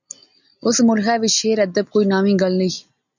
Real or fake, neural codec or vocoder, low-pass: real; none; 7.2 kHz